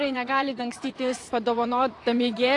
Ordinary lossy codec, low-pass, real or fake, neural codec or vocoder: AAC, 48 kbps; 10.8 kHz; fake; vocoder, 44.1 kHz, 128 mel bands, Pupu-Vocoder